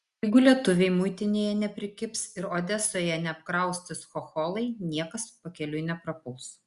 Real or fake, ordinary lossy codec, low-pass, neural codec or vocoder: real; Opus, 64 kbps; 10.8 kHz; none